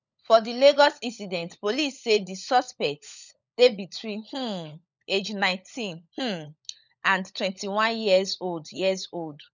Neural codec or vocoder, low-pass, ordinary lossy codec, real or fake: codec, 16 kHz, 16 kbps, FunCodec, trained on LibriTTS, 50 frames a second; 7.2 kHz; none; fake